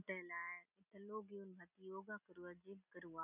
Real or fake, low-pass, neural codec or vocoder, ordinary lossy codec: real; 3.6 kHz; none; none